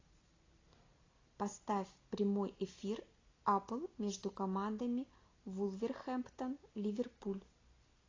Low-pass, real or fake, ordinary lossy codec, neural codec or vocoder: 7.2 kHz; real; AAC, 32 kbps; none